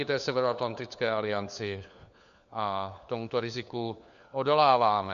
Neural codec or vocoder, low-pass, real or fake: codec, 16 kHz, 4 kbps, FunCodec, trained on LibriTTS, 50 frames a second; 7.2 kHz; fake